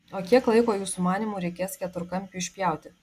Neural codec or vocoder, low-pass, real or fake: none; 14.4 kHz; real